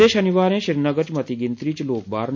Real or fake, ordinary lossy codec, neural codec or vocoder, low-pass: real; MP3, 64 kbps; none; 7.2 kHz